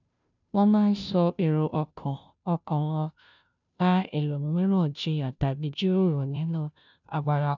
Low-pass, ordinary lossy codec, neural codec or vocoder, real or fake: 7.2 kHz; none; codec, 16 kHz, 0.5 kbps, FunCodec, trained on Chinese and English, 25 frames a second; fake